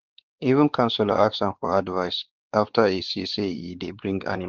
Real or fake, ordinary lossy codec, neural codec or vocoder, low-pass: fake; Opus, 16 kbps; codec, 16 kHz, 4 kbps, X-Codec, WavLM features, trained on Multilingual LibriSpeech; 7.2 kHz